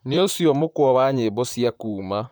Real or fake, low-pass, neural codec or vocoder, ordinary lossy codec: fake; none; vocoder, 44.1 kHz, 128 mel bands, Pupu-Vocoder; none